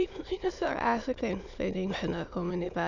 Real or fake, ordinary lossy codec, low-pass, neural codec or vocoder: fake; none; 7.2 kHz; autoencoder, 22.05 kHz, a latent of 192 numbers a frame, VITS, trained on many speakers